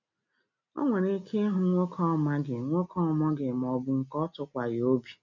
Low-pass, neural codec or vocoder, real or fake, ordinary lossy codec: 7.2 kHz; none; real; none